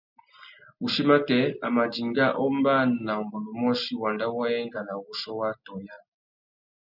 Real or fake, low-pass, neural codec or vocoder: real; 5.4 kHz; none